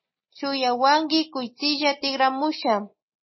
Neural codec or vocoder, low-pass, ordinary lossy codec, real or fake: none; 7.2 kHz; MP3, 24 kbps; real